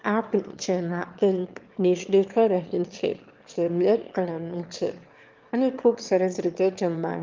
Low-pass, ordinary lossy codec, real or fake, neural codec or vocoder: 7.2 kHz; Opus, 32 kbps; fake; autoencoder, 22.05 kHz, a latent of 192 numbers a frame, VITS, trained on one speaker